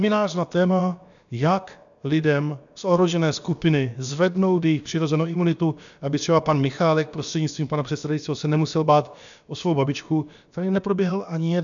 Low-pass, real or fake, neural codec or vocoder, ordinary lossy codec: 7.2 kHz; fake; codec, 16 kHz, about 1 kbps, DyCAST, with the encoder's durations; MP3, 96 kbps